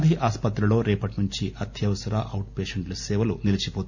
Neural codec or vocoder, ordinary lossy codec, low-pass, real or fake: none; none; 7.2 kHz; real